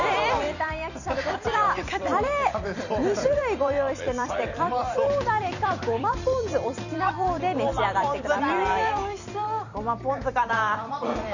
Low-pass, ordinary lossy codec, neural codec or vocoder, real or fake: 7.2 kHz; none; vocoder, 44.1 kHz, 128 mel bands every 256 samples, BigVGAN v2; fake